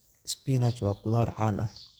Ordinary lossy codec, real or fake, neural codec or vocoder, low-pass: none; fake; codec, 44.1 kHz, 2.6 kbps, SNAC; none